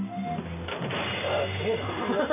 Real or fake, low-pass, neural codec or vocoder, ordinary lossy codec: real; 3.6 kHz; none; none